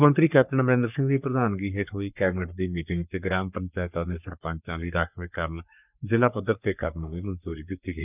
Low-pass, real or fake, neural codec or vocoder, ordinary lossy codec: 3.6 kHz; fake; codec, 44.1 kHz, 3.4 kbps, Pupu-Codec; none